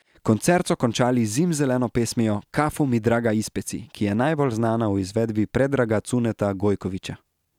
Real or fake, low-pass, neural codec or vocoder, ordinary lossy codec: real; 19.8 kHz; none; none